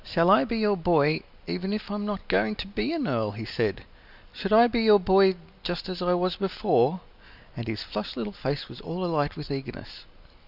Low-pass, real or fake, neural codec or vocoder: 5.4 kHz; real; none